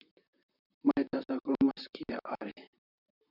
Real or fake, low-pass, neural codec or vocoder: fake; 5.4 kHz; vocoder, 44.1 kHz, 128 mel bands, Pupu-Vocoder